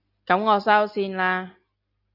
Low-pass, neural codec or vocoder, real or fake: 5.4 kHz; none; real